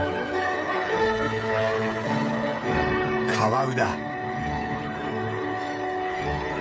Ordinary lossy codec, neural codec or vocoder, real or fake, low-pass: none; codec, 16 kHz, 16 kbps, FreqCodec, smaller model; fake; none